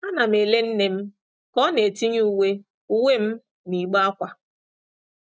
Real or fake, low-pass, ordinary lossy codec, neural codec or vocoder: real; none; none; none